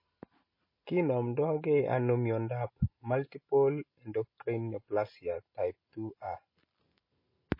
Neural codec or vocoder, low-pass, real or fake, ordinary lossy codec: none; 5.4 kHz; real; MP3, 24 kbps